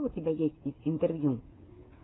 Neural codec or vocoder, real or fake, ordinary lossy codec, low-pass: codec, 16 kHz, 8 kbps, FreqCodec, smaller model; fake; AAC, 16 kbps; 7.2 kHz